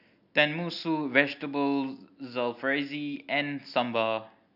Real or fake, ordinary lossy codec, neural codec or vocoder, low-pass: real; none; none; 5.4 kHz